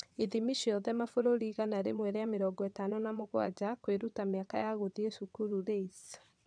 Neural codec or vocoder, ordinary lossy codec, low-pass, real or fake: vocoder, 22.05 kHz, 80 mel bands, WaveNeXt; none; 9.9 kHz; fake